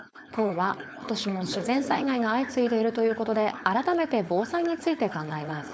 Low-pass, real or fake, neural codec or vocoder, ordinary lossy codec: none; fake; codec, 16 kHz, 4.8 kbps, FACodec; none